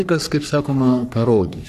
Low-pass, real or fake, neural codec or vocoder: 14.4 kHz; fake; codec, 44.1 kHz, 3.4 kbps, Pupu-Codec